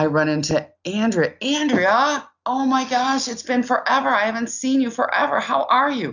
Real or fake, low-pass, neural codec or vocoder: real; 7.2 kHz; none